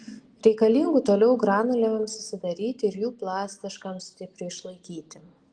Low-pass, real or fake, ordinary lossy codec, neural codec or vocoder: 9.9 kHz; real; Opus, 24 kbps; none